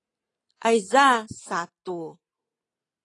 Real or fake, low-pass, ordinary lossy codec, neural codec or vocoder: real; 10.8 kHz; AAC, 32 kbps; none